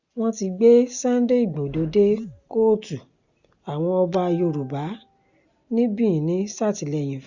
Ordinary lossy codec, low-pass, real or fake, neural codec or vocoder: Opus, 64 kbps; 7.2 kHz; real; none